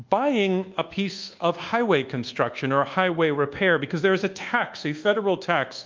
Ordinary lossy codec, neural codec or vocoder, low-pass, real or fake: Opus, 24 kbps; codec, 24 kHz, 1.2 kbps, DualCodec; 7.2 kHz; fake